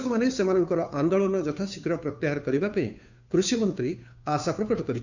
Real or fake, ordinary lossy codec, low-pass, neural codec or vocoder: fake; none; 7.2 kHz; codec, 16 kHz, 2 kbps, FunCodec, trained on Chinese and English, 25 frames a second